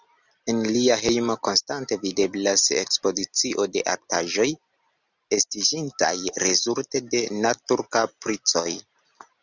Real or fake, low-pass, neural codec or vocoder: real; 7.2 kHz; none